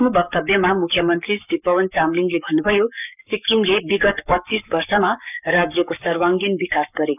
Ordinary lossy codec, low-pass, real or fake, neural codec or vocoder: none; 3.6 kHz; fake; codec, 44.1 kHz, 7.8 kbps, Pupu-Codec